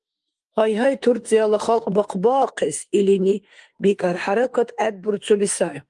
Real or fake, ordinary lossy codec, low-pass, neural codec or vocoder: fake; Opus, 24 kbps; 10.8 kHz; autoencoder, 48 kHz, 32 numbers a frame, DAC-VAE, trained on Japanese speech